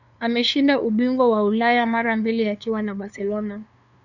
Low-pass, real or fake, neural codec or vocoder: 7.2 kHz; fake; codec, 16 kHz, 2 kbps, FunCodec, trained on LibriTTS, 25 frames a second